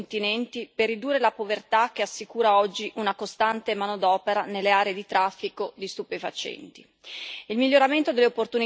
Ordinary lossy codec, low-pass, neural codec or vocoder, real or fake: none; none; none; real